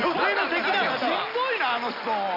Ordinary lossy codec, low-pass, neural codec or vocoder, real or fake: none; 5.4 kHz; none; real